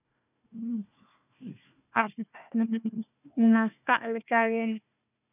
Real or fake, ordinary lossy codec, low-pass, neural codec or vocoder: fake; none; 3.6 kHz; codec, 16 kHz, 1 kbps, FunCodec, trained on Chinese and English, 50 frames a second